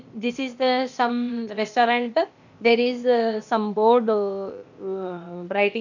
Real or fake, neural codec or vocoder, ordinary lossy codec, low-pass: fake; codec, 16 kHz, 0.8 kbps, ZipCodec; none; 7.2 kHz